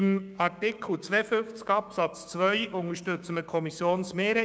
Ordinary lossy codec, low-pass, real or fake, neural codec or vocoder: none; none; fake; codec, 16 kHz, 6 kbps, DAC